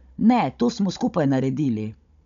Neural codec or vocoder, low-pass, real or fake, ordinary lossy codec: codec, 16 kHz, 16 kbps, FunCodec, trained on Chinese and English, 50 frames a second; 7.2 kHz; fake; none